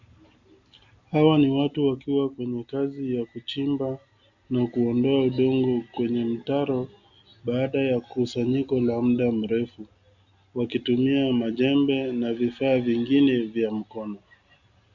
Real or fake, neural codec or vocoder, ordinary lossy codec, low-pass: real; none; Opus, 64 kbps; 7.2 kHz